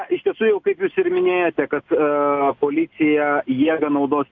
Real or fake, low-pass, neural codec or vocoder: real; 7.2 kHz; none